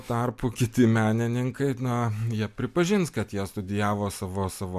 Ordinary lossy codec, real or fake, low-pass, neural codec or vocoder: MP3, 96 kbps; real; 14.4 kHz; none